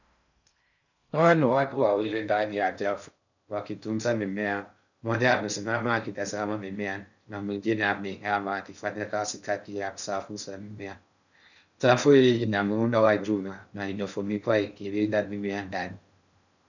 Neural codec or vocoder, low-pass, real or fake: codec, 16 kHz in and 24 kHz out, 0.6 kbps, FocalCodec, streaming, 4096 codes; 7.2 kHz; fake